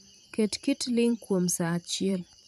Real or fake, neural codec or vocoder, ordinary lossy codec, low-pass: fake; vocoder, 44.1 kHz, 128 mel bands every 512 samples, BigVGAN v2; none; 14.4 kHz